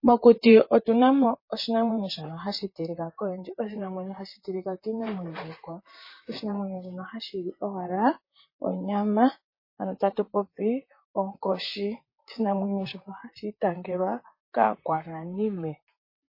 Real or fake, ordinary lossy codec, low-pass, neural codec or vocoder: fake; MP3, 24 kbps; 5.4 kHz; vocoder, 44.1 kHz, 128 mel bands, Pupu-Vocoder